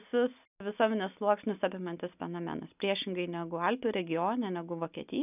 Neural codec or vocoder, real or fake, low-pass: none; real; 3.6 kHz